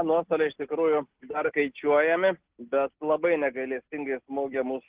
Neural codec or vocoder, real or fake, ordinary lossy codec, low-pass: none; real; Opus, 16 kbps; 3.6 kHz